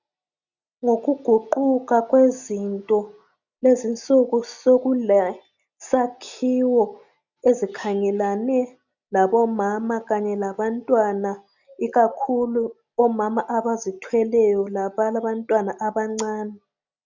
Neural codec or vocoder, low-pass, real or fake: none; 7.2 kHz; real